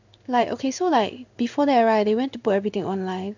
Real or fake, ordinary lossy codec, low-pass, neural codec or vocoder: fake; none; 7.2 kHz; codec, 16 kHz in and 24 kHz out, 1 kbps, XY-Tokenizer